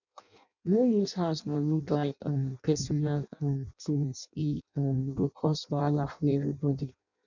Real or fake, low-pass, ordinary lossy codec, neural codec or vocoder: fake; 7.2 kHz; none; codec, 16 kHz in and 24 kHz out, 0.6 kbps, FireRedTTS-2 codec